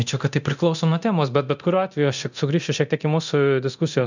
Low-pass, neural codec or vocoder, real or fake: 7.2 kHz; codec, 24 kHz, 0.9 kbps, DualCodec; fake